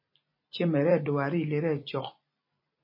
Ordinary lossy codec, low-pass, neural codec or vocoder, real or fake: MP3, 24 kbps; 5.4 kHz; vocoder, 44.1 kHz, 128 mel bands every 256 samples, BigVGAN v2; fake